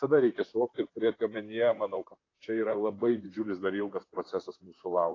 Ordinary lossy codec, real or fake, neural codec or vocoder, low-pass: AAC, 32 kbps; fake; vocoder, 44.1 kHz, 128 mel bands, Pupu-Vocoder; 7.2 kHz